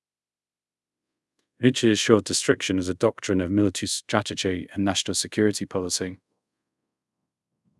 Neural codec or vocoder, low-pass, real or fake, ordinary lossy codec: codec, 24 kHz, 0.5 kbps, DualCodec; none; fake; none